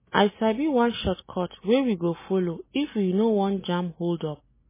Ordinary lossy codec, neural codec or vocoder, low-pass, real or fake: MP3, 16 kbps; none; 3.6 kHz; real